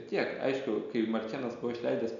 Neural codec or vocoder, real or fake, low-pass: none; real; 7.2 kHz